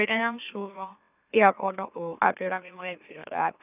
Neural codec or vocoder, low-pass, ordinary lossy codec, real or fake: autoencoder, 44.1 kHz, a latent of 192 numbers a frame, MeloTTS; 3.6 kHz; none; fake